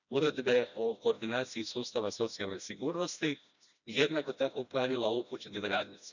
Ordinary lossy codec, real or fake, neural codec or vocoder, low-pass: none; fake; codec, 16 kHz, 1 kbps, FreqCodec, smaller model; 7.2 kHz